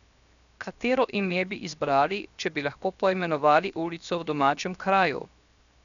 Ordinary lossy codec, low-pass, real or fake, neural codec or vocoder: none; 7.2 kHz; fake; codec, 16 kHz, 0.7 kbps, FocalCodec